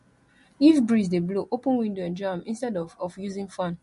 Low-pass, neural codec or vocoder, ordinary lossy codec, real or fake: 14.4 kHz; none; MP3, 48 kbps; real